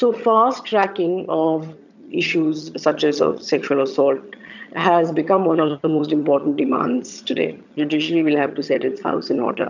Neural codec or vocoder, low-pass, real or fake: vocoder, 22.05 kHz, 80 mel bands, HiFi-GAN; 7.2 kHz; fake